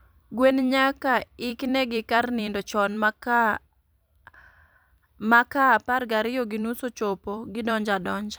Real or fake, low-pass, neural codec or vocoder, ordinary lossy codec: fake; none; vocoder, 44.1 kHz, 128 mel bands every 256 samples, BigVGAN v2; none